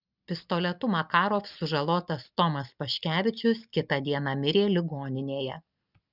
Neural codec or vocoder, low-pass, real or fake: none; 5.4 kHz; real